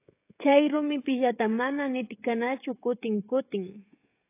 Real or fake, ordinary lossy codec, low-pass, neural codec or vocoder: fake; AAC, 24 kbps; 3.6 kHz; codec, 16 kHz, 16 kbps, FreqCodec, smaller model